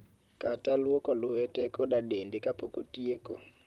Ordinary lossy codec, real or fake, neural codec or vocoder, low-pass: Opus, 32 kbps; fake; vocoder, 44.1 kHz, 128 mel bands, Pupu-Vocoder; 19.8 kHz